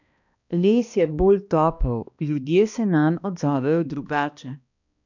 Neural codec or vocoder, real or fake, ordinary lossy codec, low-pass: codec, 16 kHz, 1 kbps, X-Codec, HuBERT features, trained on balanced general audio; fake; none; 7.2 kHz